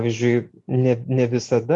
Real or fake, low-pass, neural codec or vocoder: real; 10.8 kHz; none